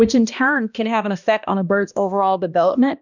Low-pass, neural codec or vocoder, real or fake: 7.2 kHz; codec, 16 kHz, 1 kbps, X-Codec, HuBERT features, trained on balanced general audio; fake